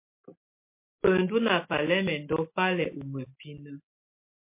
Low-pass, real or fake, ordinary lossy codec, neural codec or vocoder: 3.6 kHz; real; MP3, 24 kbps; none